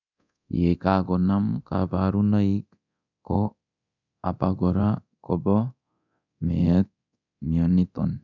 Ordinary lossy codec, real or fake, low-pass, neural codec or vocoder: none; fake; 7.2 kHz; codec, 24 kHz, 0.9 kbps, DualCodec